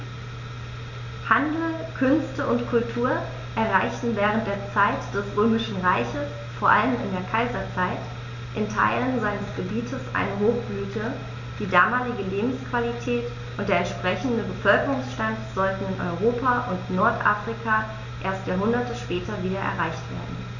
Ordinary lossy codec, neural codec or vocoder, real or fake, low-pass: none; none; real; 7.2 kHz